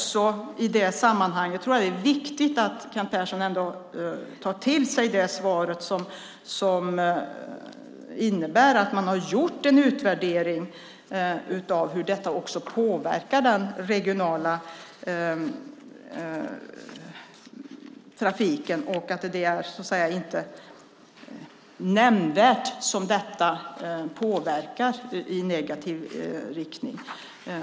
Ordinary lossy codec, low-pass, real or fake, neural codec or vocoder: none; none; real; none